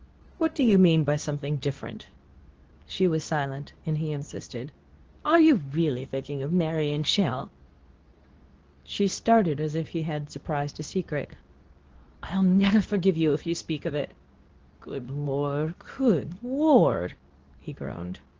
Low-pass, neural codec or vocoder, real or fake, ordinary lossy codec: 7.2 kHz; codec, 24 kHz, 0.9 kbps, WavTokenizer, medium speech release version 2; fake; Opus, 16 kbps